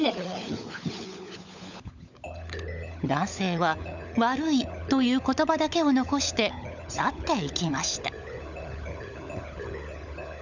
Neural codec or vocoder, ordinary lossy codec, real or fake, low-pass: codec, 16 kHz, 16 kbps, FunCodec, trained on LibriTTS, 50 frames a second; none; fake; 7.2 kHz